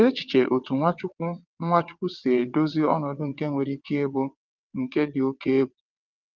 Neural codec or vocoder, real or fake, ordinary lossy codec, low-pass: vocoder, 22.05 kHz, 80 mel bands, WaveNeXt; fake; Opus, 24 kbps; 7.2 kHz